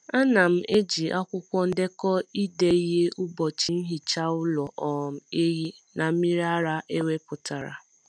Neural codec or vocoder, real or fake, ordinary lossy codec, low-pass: none; real; none; none